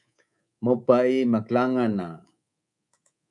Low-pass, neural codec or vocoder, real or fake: 10.8 kHz; codec, 24 kHz, 3.1 kbps, DualCodec; fake